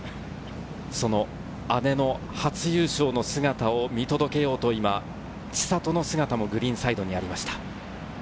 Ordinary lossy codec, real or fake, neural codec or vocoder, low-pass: none; real; none; none